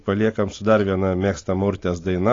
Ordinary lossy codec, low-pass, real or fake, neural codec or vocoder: AAC, 32 kbps; 7.2 kHz; real; none